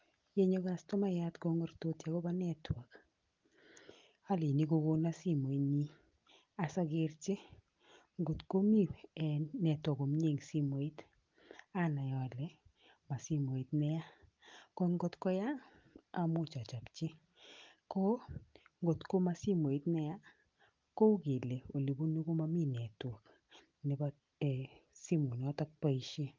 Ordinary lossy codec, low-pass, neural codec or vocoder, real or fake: Opus, 32 kbps; 7.2 kHz; none; real